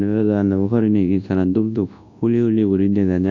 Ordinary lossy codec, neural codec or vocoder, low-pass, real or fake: AAC, 48 kbps; codec, 24 kHz, 0.9 kbps, WavTokenizer, large speech release; 7.2 kHz; fake